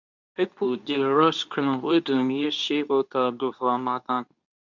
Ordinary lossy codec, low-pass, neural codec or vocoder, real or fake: none; 7.2 kHz; codec, 24 kHz, 0.9 kbps, WavTokenizer, medium speech release version 2; fake